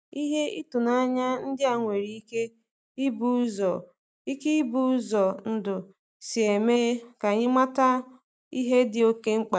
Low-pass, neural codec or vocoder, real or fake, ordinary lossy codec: none; none; real; none